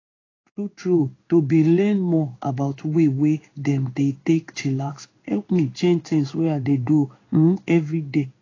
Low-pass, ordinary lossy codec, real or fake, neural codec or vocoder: 7.2 kHz; AAC, 32 kbps; fake; codec, 16 kHz in and 24 kHz out, 1 kbps, XY-Tokenizer